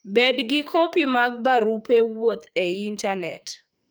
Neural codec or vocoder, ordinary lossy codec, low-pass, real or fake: codec, 44.1 kHz, 2.6 kbps, SNAC; none; none; fake